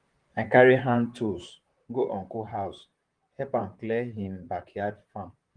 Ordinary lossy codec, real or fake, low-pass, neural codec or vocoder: Opus, 24 kbps; fake; 9.9 kHz; vocoder, 44.1 kHz, 128 mel bands, Pupu-Vocoder